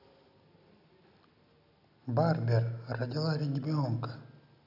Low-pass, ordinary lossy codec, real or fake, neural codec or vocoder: 5.4 kHz; none; real; none